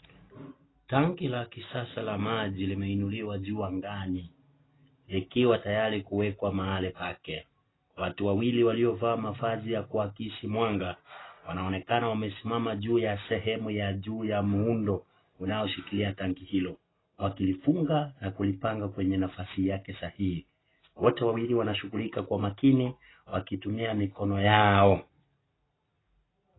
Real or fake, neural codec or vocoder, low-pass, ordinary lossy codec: real; none; 7.2 kHz; AAC, 16 kbps